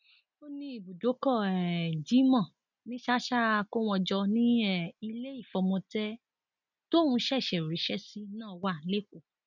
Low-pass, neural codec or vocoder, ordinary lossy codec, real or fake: 7.2 kHz; none; none; real